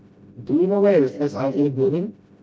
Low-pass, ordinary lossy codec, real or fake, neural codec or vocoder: none; none; fake; codec, 16 kHz, 0.5 kbps, FreqCodec, smaller model